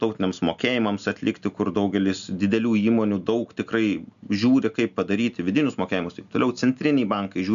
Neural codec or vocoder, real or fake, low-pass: none; real; 7.2 kHz